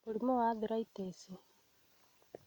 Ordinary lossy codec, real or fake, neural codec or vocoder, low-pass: none; real; none; 19.8 kHz